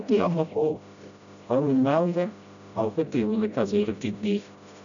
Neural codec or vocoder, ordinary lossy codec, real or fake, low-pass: codec, 16 kHz, 0.5 kbps, FreqCodec, smaller model; MP3, 96 kbps; fake; 7.2 kHz